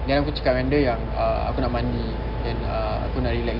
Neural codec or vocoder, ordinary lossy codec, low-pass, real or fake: none; Opus, 32 kbps; 5.4 kHz; real